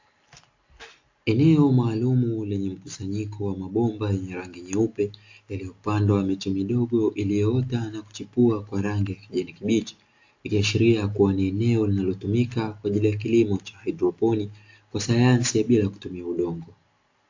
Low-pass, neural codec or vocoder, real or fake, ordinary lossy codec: 7.2 kHz; none; real; AAC, 48 kbps